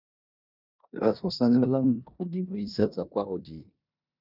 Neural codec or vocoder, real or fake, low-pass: codec, 16 kHz in and 24 kHz out, 0.9 kbps, LongCat-Audio-Codec, four codebook decoder; fake; 5.4 kHz